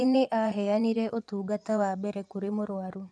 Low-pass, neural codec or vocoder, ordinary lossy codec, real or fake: none; vocoder, 24 kHz, 100 mel bands, Vocos; none; fake